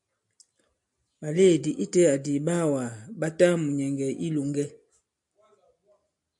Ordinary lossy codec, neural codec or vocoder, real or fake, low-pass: MP3, 96 kbps; none; real; 10.8 kHz